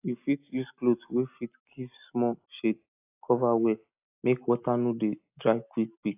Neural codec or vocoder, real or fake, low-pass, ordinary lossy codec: none; real; 3.6 kHz; AAC, 32 kbps